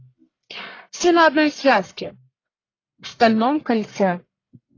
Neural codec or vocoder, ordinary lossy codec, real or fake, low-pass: codec, 44.1 kHz, 1.7 kbps, Pupu-Codec; AAC, 32 kbps; fake; 7.2 kHz